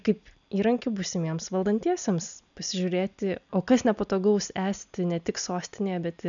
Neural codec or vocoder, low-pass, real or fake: none; 7.2 kHz; real